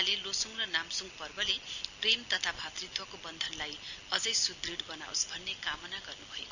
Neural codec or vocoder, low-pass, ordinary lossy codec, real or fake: none; 7.2 kHz; none; real